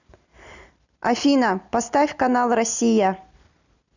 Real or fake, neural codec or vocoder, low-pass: real; none; 7.2 kHz